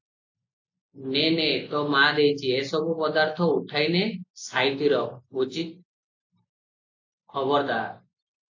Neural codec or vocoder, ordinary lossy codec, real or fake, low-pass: none; AAC, 48 kbps; real; 7.2 kHz